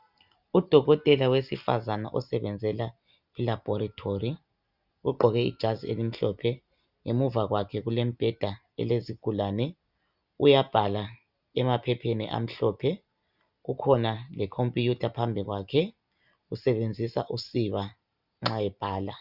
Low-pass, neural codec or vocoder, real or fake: 5.4 kHz; none; real